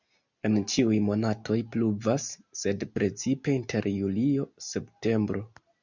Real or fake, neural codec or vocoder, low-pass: real; none; 7.2 kHz